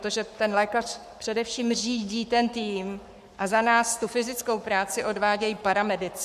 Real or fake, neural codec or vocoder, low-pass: fake; codec, 44.1 kHz, 7.8 kbps, Pupu-Codec; 14.4 kHz